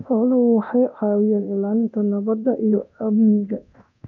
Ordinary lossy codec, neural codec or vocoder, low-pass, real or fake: none; codec, 24 kHz, 0.9 kbps, DualCodec; 7.2 kHz; fake